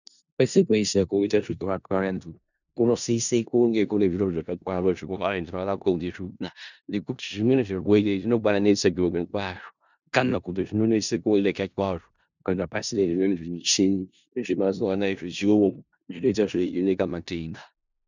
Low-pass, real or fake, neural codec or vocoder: 7.2 kHz; fake; codec, 16 kHz in and 24 kHz out, 0.4 kbps, LongCat-Audio-Codec, four codebook decoder